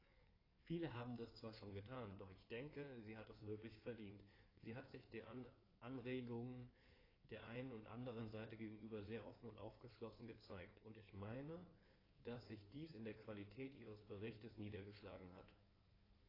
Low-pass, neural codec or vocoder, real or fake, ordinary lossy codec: 5.4 kHz; codec, 16 kHz in and 24 kHz out, 2.2 kbps, FireRedTTS-2 codec; fake; AAC, 24 kbps